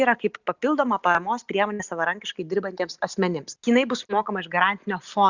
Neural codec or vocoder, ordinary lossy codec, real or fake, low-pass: none; Opus, 64 kbps; real; 7.2 kHz